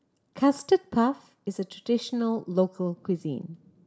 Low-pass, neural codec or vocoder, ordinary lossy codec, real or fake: none; none; none; real